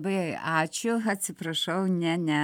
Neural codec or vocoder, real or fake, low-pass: vocoder, 44.1 kHz, 128 mel bands every 512 samples, BigVGAN v2; fake; 19.8 kHz